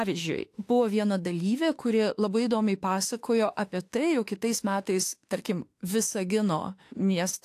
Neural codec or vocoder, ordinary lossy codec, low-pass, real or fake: autoencoder, 48 kHz, 32 numbers a frame, DAC-VAE, trained on Japanese speech; AAC, 64 kbps; 14.4 kHz; fake